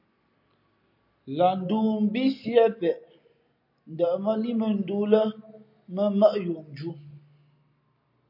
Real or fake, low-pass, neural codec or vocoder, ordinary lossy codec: real; 5.4 kHz; none; MP3, 32 kbps